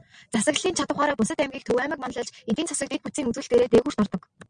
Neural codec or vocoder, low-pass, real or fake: none; 9.9 kHz; real